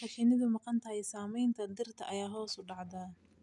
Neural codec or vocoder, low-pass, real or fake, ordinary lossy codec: none; 10.8 kHz; real; none